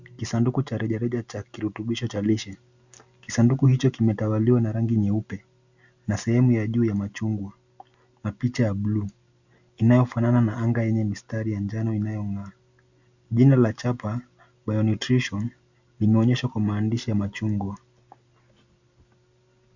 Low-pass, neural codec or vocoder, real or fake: 7.2 kHz; none; real